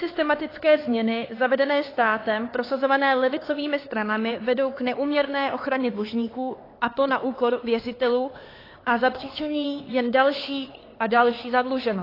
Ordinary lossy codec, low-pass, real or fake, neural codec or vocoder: AAC, 24 kbps; 5.4 kHz; fake; codec, 16 kHz, 4 kbps, X-Codec, HuBERT features, trained on LibriSpeech